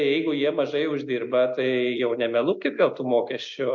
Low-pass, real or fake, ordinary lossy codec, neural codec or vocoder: 7.2 kHz; real; MP3, 48 kbps; none